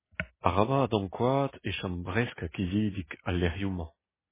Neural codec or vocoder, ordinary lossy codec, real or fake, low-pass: none; MP3, 16 kbps; real; 3.6 kHz